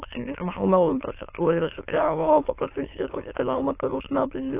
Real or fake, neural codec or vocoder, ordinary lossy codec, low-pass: fake; autoencoder, 22.05 kHz, a latent of 192 numbers a frame, VITS, trained on many speakers; AAC, 24 kbps; 3.6 kHz